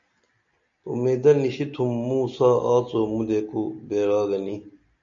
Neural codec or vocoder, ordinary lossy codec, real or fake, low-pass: none; MP3, 64 kbps; real; 7.2 kHz